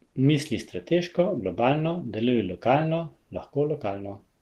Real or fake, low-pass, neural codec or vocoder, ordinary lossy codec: real; 14.4 kHz; none; Opus, 16 kbps